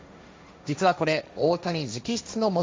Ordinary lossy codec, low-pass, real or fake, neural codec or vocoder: none; none; fake; codec, 16 kHz, 1.1 kbps, Voila-Tokenizer